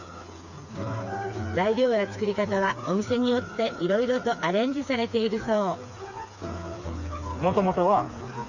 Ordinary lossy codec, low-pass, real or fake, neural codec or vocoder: none; 7.2 kHz; fake; codec, 16 kHz, 4 kbps, FreqCodec, smaller model